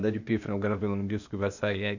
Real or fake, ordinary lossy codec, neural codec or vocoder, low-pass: fake; none; codec, 16 kHz, 0.8 kbps, ZipCodec; 7.2 kHz